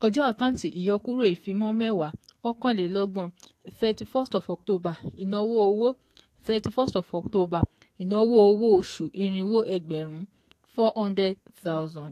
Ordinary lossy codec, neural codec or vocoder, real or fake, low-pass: AAC, 64 kbps; codec, 44.1 kHz, 2.6 kbps, SNAC; fake; 14.4 kHz